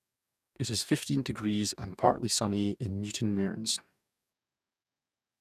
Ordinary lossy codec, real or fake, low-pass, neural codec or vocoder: none; fake; 14.4 kHz; codec, 44.1 kHz, 2.6 kbps, DAC